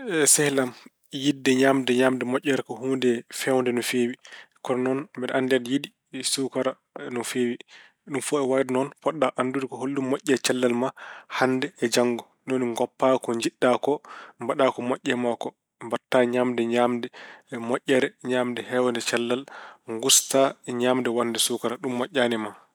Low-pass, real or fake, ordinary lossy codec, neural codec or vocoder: none; real; none; none